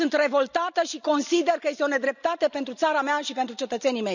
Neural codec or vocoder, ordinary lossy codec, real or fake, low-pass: none; none; real; 7.2 kHz